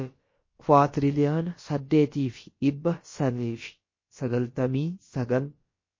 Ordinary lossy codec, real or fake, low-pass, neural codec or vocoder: MP3, 32 kbps; fake; 7.2 kHz; codec, 16 kHz, about 1 kbps, DyCAST, with the encoder's durations